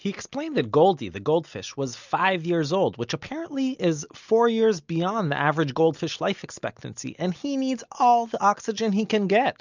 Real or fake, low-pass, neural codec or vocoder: real; 7.2 kHz; none